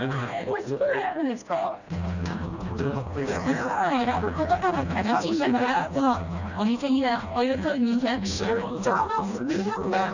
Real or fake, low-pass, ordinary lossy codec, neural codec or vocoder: fake; 7.2 kHz; none; codec, 16 kHz, 1 kbps, FreqCodec, smaller model